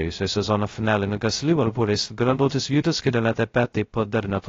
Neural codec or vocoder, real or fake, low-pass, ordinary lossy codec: codec, 16 kHz, 0.2 kbps, FocalCodec; fake; 7.2 kHz; AAC, 32 kbps